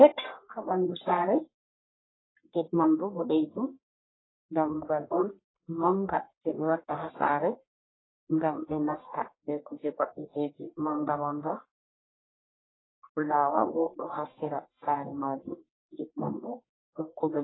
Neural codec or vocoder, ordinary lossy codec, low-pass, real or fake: codec, 44.1 kHz, 1.7 kbps, Pupu-Codec; AAC, 16 kbps; 7.2 kHz; fake